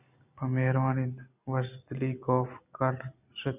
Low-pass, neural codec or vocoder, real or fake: 3.6 kHz; none; real